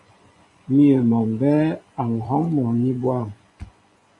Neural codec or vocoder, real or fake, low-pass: vocoder, 24 kHz, 100 mel bands, Vocos; fake; 10.8 kHz